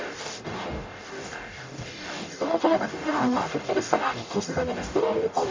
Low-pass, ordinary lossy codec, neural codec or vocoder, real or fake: 7.2 kHz; MP3, 48 kbps; codec, 44.1 kHz, 0.9 kbps, DAC; fake